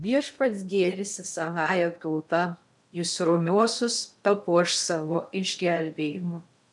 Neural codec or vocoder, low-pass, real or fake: codec, 16 kHz in and 24 kHz out, 0.6 kbps, FocalCodec, streaming, 2048 codes; 10.8 kHz; fake